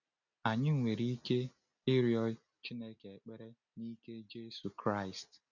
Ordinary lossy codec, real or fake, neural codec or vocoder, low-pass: none; real; none; 7.2 kHz